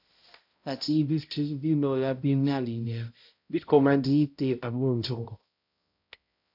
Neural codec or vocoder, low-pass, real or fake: codec, 16 kHz, 0.5 kbps, X-Codec, HuBERT features, trained on balanced general audio; 5.4 kHz; fake